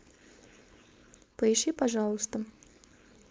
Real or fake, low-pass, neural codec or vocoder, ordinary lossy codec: fake; none; codec, 16 kHz, 4.8 kbps, FACodec; none